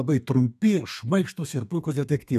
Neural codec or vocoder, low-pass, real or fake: codec, 32 kHz, 1.9 kbps, SNAC; 14.4 kHz; fake